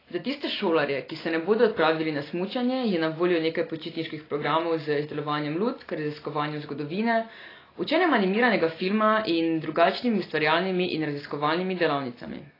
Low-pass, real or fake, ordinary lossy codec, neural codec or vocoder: 5.4 kHz; real; AAC, 24 kbps; none